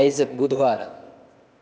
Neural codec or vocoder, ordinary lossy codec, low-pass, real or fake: codec, 16 kHz, 0.8 kbps, ZipCodec; none; none; fake